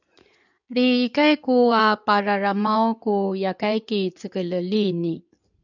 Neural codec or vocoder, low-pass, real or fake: codec, 16 kHz in and 24 kHz out, 2.2 kbps, FireRedTTS-2 codec; 7.2 kHz; fake